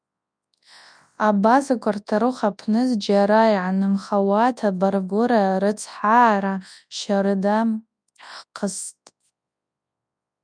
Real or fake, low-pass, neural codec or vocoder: fake; 9.9 kHz; codec, 24 kHz, 0.9 kbps, WavTokenizer, large speech release